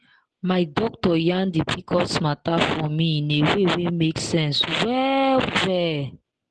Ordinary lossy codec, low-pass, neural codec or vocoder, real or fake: Opus, 16 kbps; 10.8 kHz; none; real